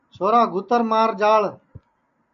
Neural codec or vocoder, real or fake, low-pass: none; real; 7.2 kHz